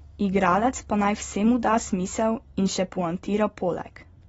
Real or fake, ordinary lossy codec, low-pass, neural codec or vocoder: real; AAC, 24 kbps; 19.8 kHz; none